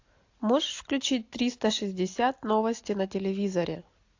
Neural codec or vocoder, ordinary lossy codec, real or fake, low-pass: none; AAC, 48 kbps; real; 7.2 kHz